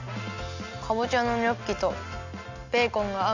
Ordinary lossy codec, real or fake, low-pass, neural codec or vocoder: none; real; 7.2 kHz; none